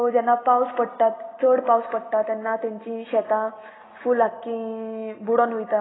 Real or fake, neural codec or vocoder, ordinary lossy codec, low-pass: real; none; AAC, 16 kbps; 7.2 kHz